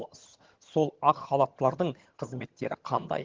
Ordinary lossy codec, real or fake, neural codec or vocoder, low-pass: Opus, 16 kbps; fake; vocoder, 22.05 kHz, 80 mel bands, HiFi-GAN; 7.2 kHz